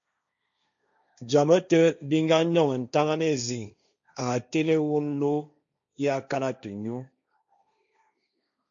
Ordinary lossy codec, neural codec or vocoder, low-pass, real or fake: MP3, 64 kbps; codec, 16 kHz, 1.1 kbps, Voila-Tokenizer; 7.2 kHz; fake